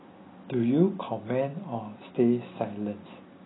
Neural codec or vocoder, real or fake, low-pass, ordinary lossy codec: none; real; 7.2 kHz; AAC, 16 kbps